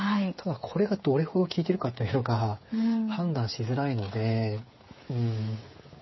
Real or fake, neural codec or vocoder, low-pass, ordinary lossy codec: fake; codec, 16 kHz, 8 kbps, FreqCodec, smaller model; 7.2 kHz; MP3, 24 kbps